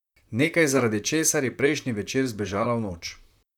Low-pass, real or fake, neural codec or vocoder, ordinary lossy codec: 19.8 kHz; fake; vocoder, 44.1 kHz, 128 mel bands, Pupu-Vocoder; none